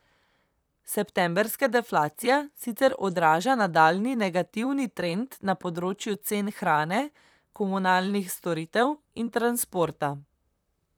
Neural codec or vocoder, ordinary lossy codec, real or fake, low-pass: vocoder, 44.1 kHz, 128 mel bands, Pupu-Vocoder; none; fake; none